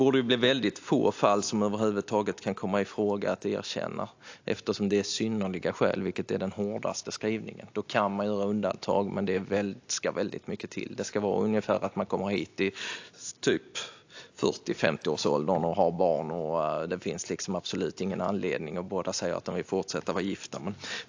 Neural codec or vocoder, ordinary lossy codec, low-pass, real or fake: none; AAC, 48 kbps; 7.2 kHz; real